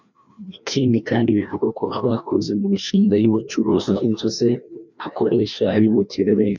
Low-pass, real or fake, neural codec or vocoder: 7.2 kHz; fake; codec, 16 kHz, 1 kbps, FreqCodec, larger model